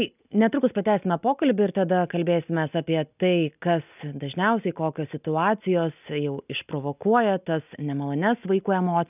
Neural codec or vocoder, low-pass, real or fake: none; 3.6 kHz; real